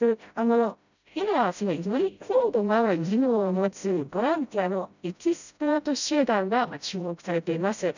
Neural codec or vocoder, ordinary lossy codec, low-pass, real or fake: codec, 16 kHz, 0.5 kbps, FreqCodec, smaller model; none; 7.2 kHz; fake